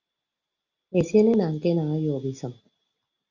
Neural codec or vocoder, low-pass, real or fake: none; 7.2 kHz; real